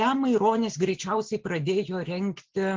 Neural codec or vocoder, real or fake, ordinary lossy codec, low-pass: none; real; Opus, 16 kbps; 7.2 kHz